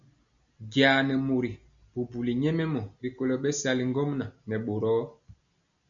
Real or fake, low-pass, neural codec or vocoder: real; 7.2 kHz; none